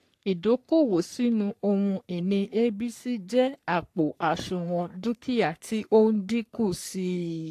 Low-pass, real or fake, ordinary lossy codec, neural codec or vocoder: 14.4 kHz; fake; AAC, 64 kbps; codec, 44.1 kHz, 3.4 kbps, Pupu-Codec